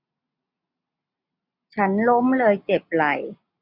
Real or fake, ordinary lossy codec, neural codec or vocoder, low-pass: real; none; none; 5.4 kHz